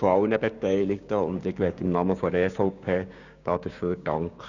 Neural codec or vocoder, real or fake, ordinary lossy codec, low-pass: codec, 16 kHz, 6 kbps, DAC; fake; none; 7.2 kHz